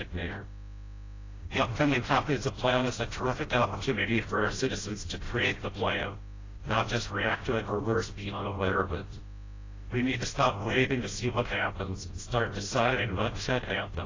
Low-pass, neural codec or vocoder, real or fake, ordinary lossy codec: 7.2 kHz; codec, 16 kHz, 0.5 kbps, FreqCodec, smaller model; fake; AAC, 32 kbps